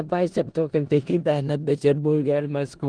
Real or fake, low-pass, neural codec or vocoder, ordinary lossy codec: fake; 9.9 kHz; codec, 16 kHz in and 24 kHz out, 0.4 kbps, LongCat-Audio-Codec, four codebook decoder; Opus, 24 kbps